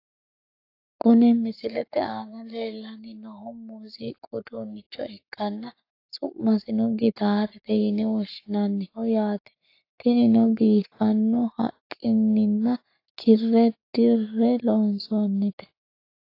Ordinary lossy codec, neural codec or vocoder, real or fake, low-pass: AAC, 32 kbps; codec, 44.1 kHz, 7.8 kbps, Pupu-Codec; fake; 5.4 kHz